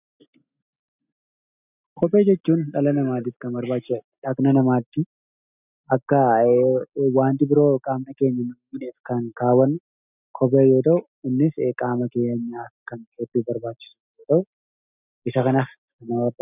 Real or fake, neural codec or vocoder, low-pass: real; none; 3.6 kHz